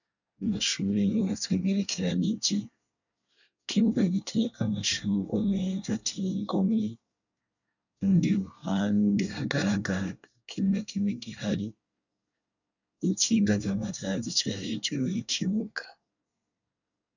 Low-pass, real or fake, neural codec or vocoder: 7.2 kHz; fake; codec, 24 kHz, 1 kbps, SNAC